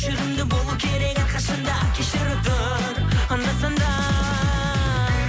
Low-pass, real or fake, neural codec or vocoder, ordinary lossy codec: none; real; none; none